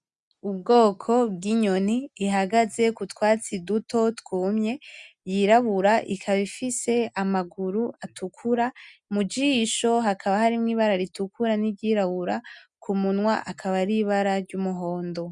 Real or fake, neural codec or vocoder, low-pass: real; none; 10.8 kHz